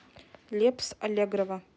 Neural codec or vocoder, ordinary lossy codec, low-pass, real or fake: none; none; none; real